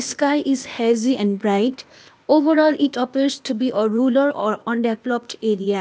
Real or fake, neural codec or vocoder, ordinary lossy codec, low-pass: fake; codec, 16 kHz, 0.8 kbps, ZipCodec; none; none